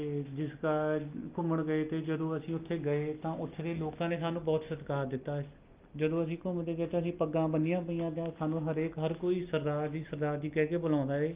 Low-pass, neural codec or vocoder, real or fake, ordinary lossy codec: 3.6 kHz; none; real; Opus, 24 kbps